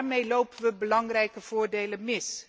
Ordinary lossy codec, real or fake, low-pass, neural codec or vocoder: none; real; none; none